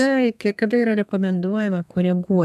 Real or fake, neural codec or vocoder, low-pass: fake; codec, 32 kHz, 1.9 kbps, SNAC; 14.4 kHz